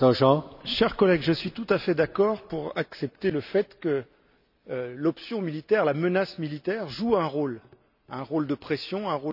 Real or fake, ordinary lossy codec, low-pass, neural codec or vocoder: real; none; 5.4 kHz; none